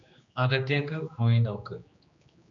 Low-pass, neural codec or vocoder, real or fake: 7.2 kHz; codec, 16 kHz, 2 kbps, X-Codec, HuBERT features, trained on general audio; fake